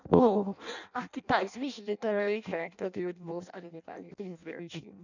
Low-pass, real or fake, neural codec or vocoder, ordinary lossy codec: 7.2 kHz; fake; codec, 16 kHz in and 24 kHz out, 0.6 kbps, FireRedTTS-2 codec; none